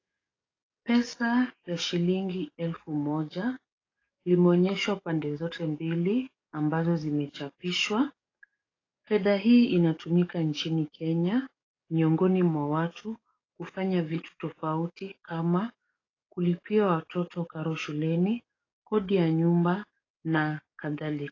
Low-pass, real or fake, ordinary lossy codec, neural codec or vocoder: 7.2 kHz; fake; AAC, 32 kbps; codec, 44.1 kHz, 7.8 kbps, DAC